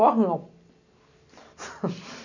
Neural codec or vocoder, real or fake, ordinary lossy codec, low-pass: none; real; none; 7.2 kHz